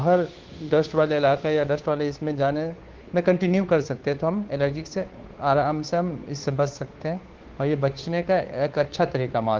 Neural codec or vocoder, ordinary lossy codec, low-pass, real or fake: codec, 16 kHz, 2 kbps, FunCodec, trained on Chinese and English, 25 frames a second; Opus, 16 kbps; 7.2 kHz; fake